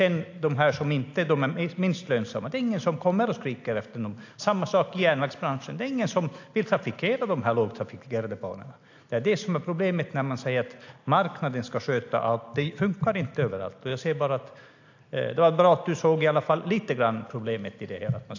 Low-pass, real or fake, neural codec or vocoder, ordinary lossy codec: 7.2 kHz; real; none; AAC, 48 kbps